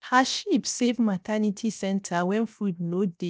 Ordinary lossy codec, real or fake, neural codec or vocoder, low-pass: none; fake; codec, 16 kHz, 0.7 kbps, FocalCodec; none